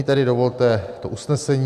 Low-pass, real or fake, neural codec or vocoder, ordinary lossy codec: 14.4 kHz; real; none; AAC, 96 kbps